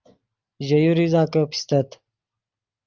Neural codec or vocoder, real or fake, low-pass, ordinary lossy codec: none; real; 7.2 kHz; Opus, 32 kbps